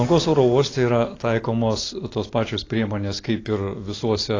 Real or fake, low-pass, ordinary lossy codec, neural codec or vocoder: real; 7.2 kHz; AAC, 32 kbps; none